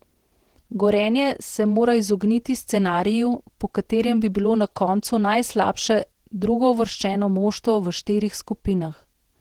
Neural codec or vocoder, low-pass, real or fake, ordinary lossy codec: vocoder, 48 kHz, 128 mel bands, Vocos; 19.8 kHz; fake; Opus, 16 kbps